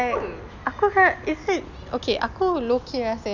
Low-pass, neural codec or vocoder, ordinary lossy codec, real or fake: 7.2 kHz; none; none; real